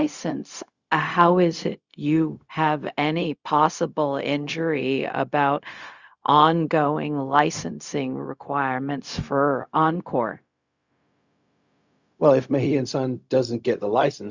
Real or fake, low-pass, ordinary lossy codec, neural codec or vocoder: fake; 7.2 kHz; Opus, 64 kbps; codec, 16 kHz, 0.4 kbps, LongCat-Audio-Codec